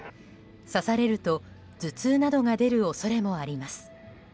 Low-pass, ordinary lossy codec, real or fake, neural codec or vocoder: none; none; real; none